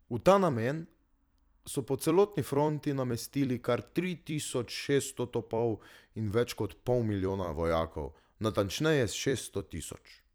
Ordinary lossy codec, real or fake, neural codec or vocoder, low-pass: none; fake; vocoder, 44.1 kHz, 128 mel bands every 256 samples, BigVGAN v2; none